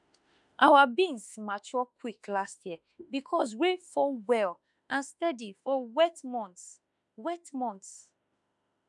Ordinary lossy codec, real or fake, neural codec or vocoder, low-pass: none; fake; autoencoder, 48 kHz, 32 numbers a frame, DAC-VAE, trained on Japanese speech; 10.8 kHz